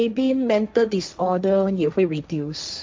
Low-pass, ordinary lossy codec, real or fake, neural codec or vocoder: none; none; fake; codec, 16 kHz, 1.1 kbps, Voila-Tokenizer